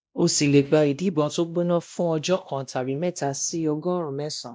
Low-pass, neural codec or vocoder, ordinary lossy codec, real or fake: none; codec, 16 kHz, 1 kbps, X-Codec, WavLM features, trained on Multilingual LibriSpeech; none; fake